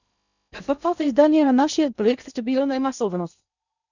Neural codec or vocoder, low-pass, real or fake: codec, 16 kHz in and 24 kHz out, 0.6 kbps, FocalCodec, streaming, 2048 codes; 7.2 kHz; fake